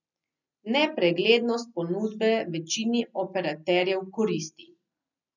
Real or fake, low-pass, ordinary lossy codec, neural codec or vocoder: real; 7.2 kHz; none; none